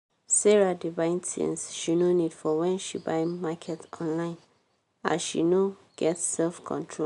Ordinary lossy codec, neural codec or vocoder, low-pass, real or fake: none; none; 10.8 kHz; real